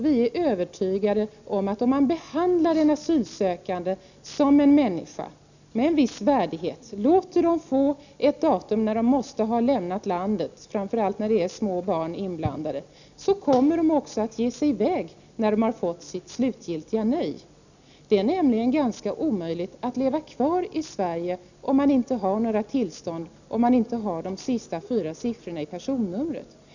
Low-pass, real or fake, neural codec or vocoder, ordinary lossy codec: 7.2 kHz; real; none; none